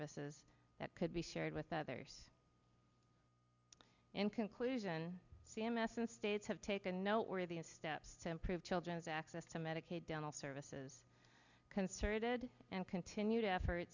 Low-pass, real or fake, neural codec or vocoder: 7.2 kHz; real; none